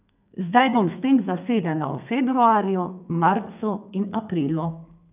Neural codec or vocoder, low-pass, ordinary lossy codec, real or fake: codec, 32 kHz, 1.9 kbps, SNAC; 3.6 kHz; none; fake